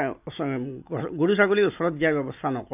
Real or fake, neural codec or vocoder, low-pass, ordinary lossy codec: real; none; 3.6 kHz; none